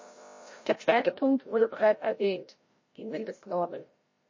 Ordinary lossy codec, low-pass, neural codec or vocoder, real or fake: MP3, 32 kbps; 7.2 kHz; codec, 16 kHz, 0.5 kbps, FreqCodec, larger model; fake